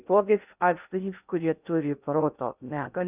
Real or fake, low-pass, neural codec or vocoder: fake; 3.6 kHz; codec, 16 kHz in and 24 kHz out, 0.6 kbps, FocalCodec, streaming, 2048 codes